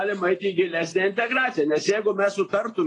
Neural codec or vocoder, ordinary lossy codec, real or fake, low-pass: none; AAC, 32 kbps; real; 10.8 kHz